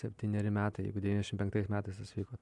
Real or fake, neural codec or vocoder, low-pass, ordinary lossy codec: real; none; 10.8 kHz; MP3, 96 kbps